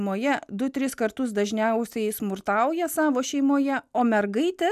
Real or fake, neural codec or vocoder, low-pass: real; none; 14.4 kHz